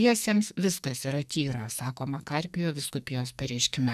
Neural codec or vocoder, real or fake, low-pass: codec, 44.1 kHz, 2.6 kbps, SNAC; fake; 14.4 kHz